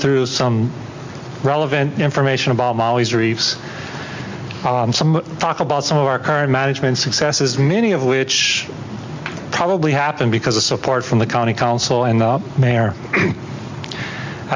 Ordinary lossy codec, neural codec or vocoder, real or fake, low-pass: MP3, 64 kbps; none; real; 7.2 kHz